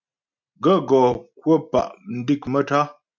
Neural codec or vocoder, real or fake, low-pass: none; real; 7.2 kHz